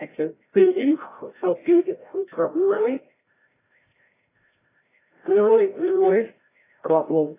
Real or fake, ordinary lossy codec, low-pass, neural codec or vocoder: fake; AAC, 16 kbps; 3.6 kHz; codec, 16 kHz, 0.5 kbps, FreqCodec, larger model